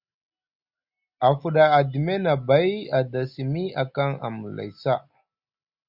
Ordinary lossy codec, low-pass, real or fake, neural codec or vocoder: Opus, 64 kbps; 5.4 kHz; real; none